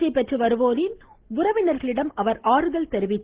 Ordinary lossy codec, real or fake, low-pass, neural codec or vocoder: Opus, 16 kbps; real; 3.6 kHz; none